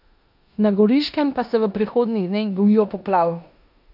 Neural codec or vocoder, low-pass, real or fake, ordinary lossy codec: codec, 16 kHz in and 24 kHz out, 0.9 kbps, LongCat-Audio-Codec, four codebook decoder; 5.4 kHz; fake; AAC, 48 kbps